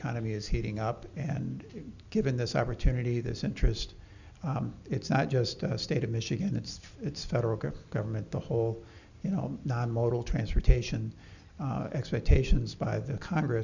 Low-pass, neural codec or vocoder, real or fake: 7.2 kHz; none; real